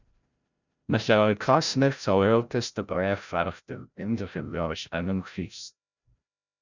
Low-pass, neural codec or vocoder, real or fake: 7.2 kHz; codec, 16 kHz, 0.5 kbps, FreqCodec, larger model; fake